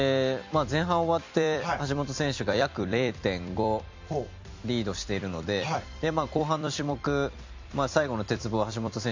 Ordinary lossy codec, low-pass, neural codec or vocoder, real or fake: MP3, 64 kbps; 7.2 kHz; vocoder, 44.1 kHz, 128 mel bands every 256 samples, BigVGAN v2; fake